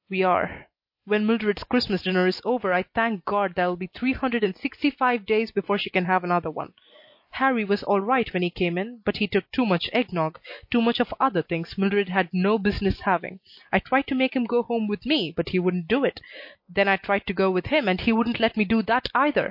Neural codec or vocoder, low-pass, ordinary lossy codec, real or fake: none; 5.4 kHz; MP3, 32 kbps; real